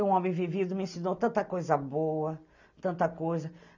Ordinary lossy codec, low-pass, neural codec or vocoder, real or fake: none; 7.2 kHz; none; real